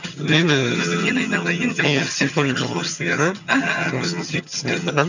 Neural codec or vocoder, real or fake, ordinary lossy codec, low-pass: vocoder, 22.05 kHz, 80 mel bands, HiFi-GAN; fake; none; 7.2 kHz